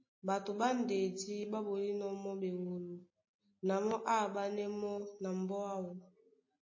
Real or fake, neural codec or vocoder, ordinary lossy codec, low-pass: real; none; MP3, 32 kbps; 7.2 kHz